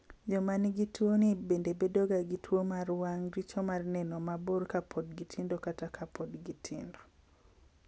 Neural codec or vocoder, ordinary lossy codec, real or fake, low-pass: none; none; real; none